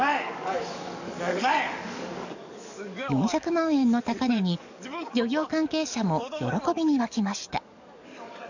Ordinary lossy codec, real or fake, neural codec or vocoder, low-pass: none; fake; codec, 44.1 kHz, 7.8 kbps, DAC; 7.2 kHz